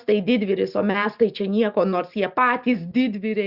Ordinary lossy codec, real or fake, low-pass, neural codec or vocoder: Opus, 64 kbps; real; 5.4 kHz; none